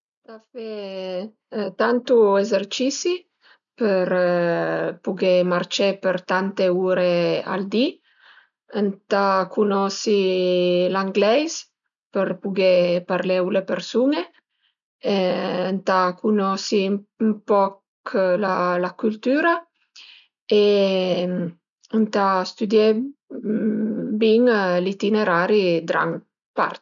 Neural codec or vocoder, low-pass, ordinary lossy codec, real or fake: none; 7.2 kHz; none; real